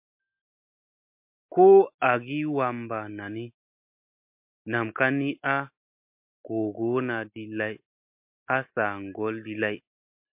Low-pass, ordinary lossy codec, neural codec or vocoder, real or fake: 3.6 kHz; MP3, 32 kbps; none; real